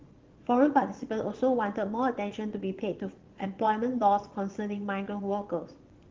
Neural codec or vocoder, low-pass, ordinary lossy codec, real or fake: vocoder, 22.05 kHz, 80 mel bands, Vocos; 7.2 kHz; Opus, 16 kbps; fake